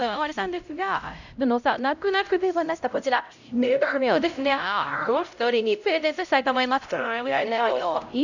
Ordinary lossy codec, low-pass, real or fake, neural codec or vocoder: none; 7.2 kHz; fake; codec, 16 kHz, 0.5 kbps, X-Codec, HuBERT features, trained on LibriSpeech